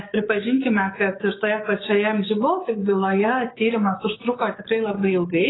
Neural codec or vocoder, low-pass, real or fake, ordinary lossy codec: codec, 16 kHz, 6 kbps, DAC; 7.2 kHz; fake; AAC, 16 kbps